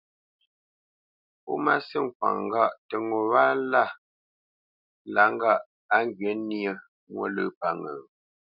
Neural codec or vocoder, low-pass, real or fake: none; 5.4 kHz; real